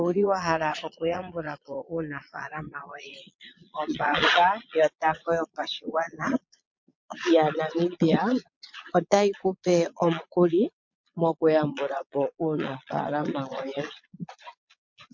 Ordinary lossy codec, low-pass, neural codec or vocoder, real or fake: MP3, 48 kbps; 7.2 kHz; vocoder, 22.05 kHz, 80 mel bands, Vocos; fake